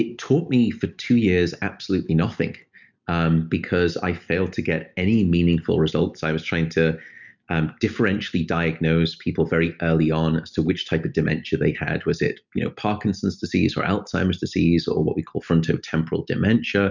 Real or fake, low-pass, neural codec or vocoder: real; 7.2 kHz; none